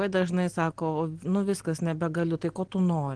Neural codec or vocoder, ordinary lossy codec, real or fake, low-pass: none; Opus, 16 kbps; real; 9.9 kHz